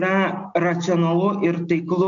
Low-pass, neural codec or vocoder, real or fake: 7.2 kHz; none; real